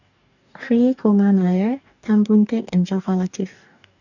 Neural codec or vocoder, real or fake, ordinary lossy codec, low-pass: codec, 44.1 kHz, 2.6 kbps, DAC; fake; none; 7.2 kHz